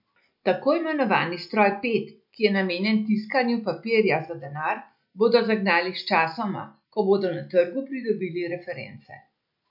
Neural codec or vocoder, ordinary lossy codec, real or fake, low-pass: none; MP3, 48 kbps; real; 5.4 kHz